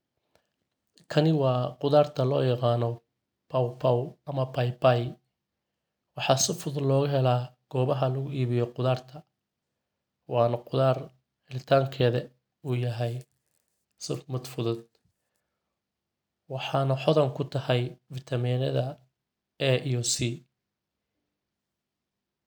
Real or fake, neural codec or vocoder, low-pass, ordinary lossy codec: real; none; none; none